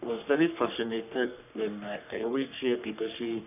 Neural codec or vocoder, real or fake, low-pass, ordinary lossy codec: codec, 44.1 kHz, 3.4 kbps, Pupu-Codec; fake; 3.6 kHz; none